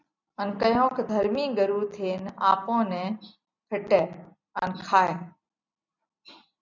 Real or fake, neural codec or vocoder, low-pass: real; none; 7.2 kHz